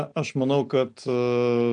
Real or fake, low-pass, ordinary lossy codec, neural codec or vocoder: real; 9.9 kHz; AAC, 64 kbps; none